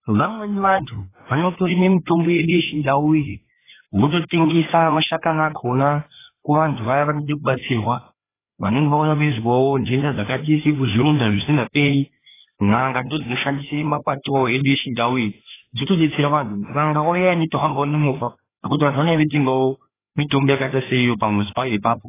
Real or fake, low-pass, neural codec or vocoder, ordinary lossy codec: fake; 3.6 kHz; codec, 16 kHz, 2 kbps, FreqCodec, larger model; AAC, 16 kbps